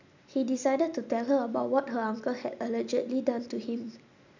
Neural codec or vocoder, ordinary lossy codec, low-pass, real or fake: none; none; 7.2 kHz; real